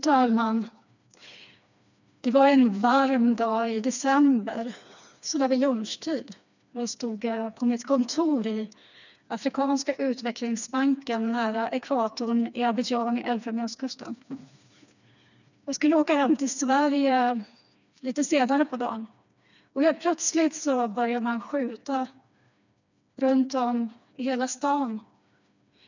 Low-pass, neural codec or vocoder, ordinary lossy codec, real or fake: 7.2 kHz; codec, 16 kHz, 2 kbps, FreqCodec, smaller model; none; fake